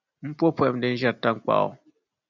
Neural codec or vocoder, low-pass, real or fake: none; 7.2 kHz; real